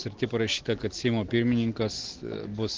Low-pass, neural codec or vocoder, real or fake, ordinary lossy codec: 7.2 kHz; none; real; Opus, 16 kbps